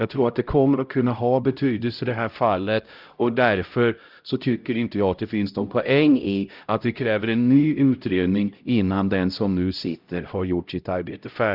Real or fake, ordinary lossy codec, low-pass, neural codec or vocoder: fake; Opus, 32 kbps; 5.4 kHz; codec, 16 kHz, 0.5 kbps, X-Codec, HuBERT features, trained on LibriSpeech